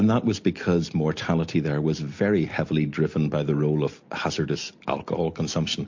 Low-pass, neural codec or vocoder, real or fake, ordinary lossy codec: 7.2 kHz; none; real; MP3, 48 kbps